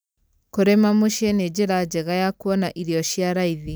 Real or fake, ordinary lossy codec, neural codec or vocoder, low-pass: real; none; none; none